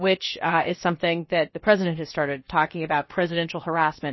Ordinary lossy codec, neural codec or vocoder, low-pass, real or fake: MP3, 24 kbps; codec, 16 kHz, about 1 kbps, DyCAST, with the encoder's durations; 7.2 kHz; fake